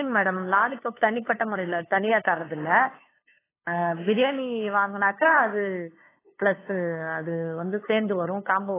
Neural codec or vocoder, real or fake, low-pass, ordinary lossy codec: codec, 16 kHz, 2 kbps, FunCodec, trained on LibriTTS, 25 frames a second; fake; 3.6 kHz; AAC, 16 kbps